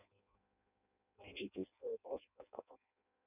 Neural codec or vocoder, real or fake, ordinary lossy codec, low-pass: codec, 16 kHz in and 24 kHz out, 0.6 kbps, FireRedTTS-2 codec; fake; none; 3.6 kHz